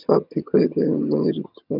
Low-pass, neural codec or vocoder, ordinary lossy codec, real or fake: 5.4 kHz; vocoder, 22.05 kHz, 80 mel bands, HiFi-GAN; none; fake